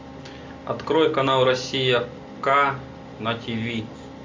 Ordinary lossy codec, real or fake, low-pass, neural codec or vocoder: MP3, 48 kbps; real; 7.2 kHz; none